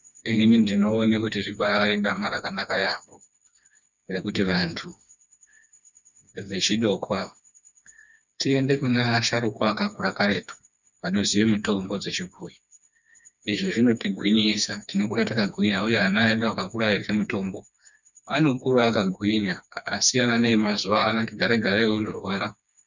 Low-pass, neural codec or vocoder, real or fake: 7.2 kHz; codec, 16 kHz, 2 kbps, FreqCodec, smaller model; fake